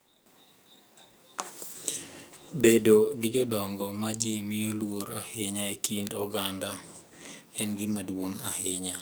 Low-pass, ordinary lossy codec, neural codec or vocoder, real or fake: none; none; codec, 44.1 kHz, 2.6 kbps, SNAC; fake